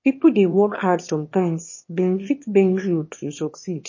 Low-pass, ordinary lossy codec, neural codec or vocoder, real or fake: 7.2 kHz; MP3, 32 kbps; autoencoder, 22.05 kHz, a latent of 192 numbers a frame, VITS, trained on one speaker; fake